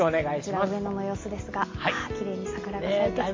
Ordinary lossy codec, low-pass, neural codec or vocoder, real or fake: MP3, 32 kbps; 7.2 kHz; none; real